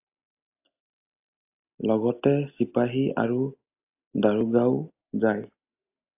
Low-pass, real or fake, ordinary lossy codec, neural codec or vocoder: 3.6 kHz; real; AAC, 32 kbps; none